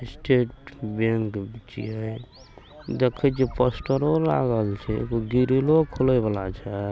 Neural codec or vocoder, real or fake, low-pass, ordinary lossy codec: none; real; none; none